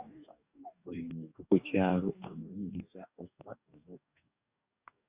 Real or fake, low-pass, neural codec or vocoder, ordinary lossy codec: fake; 3.6 kHz; codec, 44.1 kHz, 2.6 kbps, DAC; Opus, 64 kbps